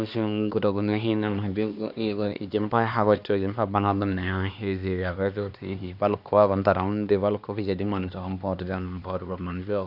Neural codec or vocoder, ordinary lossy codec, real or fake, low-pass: codec, 16 kHz, 2 kbps, X-Codec, HuBERT features, trained on LibriSpeech; none; fake; 5.4 kHz